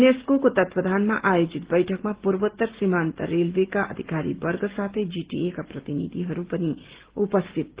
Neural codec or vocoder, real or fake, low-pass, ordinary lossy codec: none; real; 3.6 kHz; Opus, 16 kbps